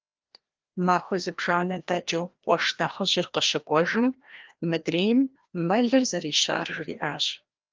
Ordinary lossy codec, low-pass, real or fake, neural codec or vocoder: Opus, 32 kbps; 7.2 kHz; fake; codec, 16 kHz, 1 kbps, FreqCodec, larger model